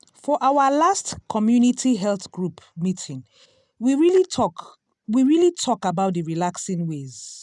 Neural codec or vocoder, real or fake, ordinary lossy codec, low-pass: none; real; none; 10.8 kHz